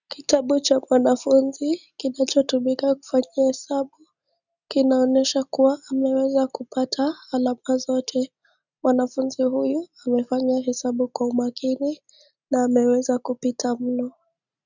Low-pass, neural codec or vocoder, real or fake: 7.2 kHz; none; real